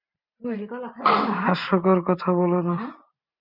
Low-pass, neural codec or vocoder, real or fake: 5.4 kHz; vocoder, 44.1 kHz, 128 mel bands every 512 samples, BigVGAN v2; fake